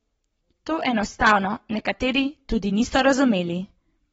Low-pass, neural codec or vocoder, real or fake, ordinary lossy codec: 19.8 kHz; codec, 44.1 kHz, 7.8 kbps, Pupu-Codec; fake; AAC, 24 kbps